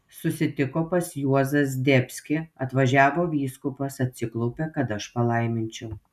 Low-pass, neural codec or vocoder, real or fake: 14.4 kHz; none; real